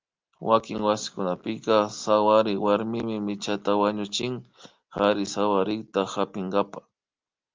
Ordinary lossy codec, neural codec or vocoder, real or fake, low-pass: Opus, 32 kbps; none; real; 7.2 kHz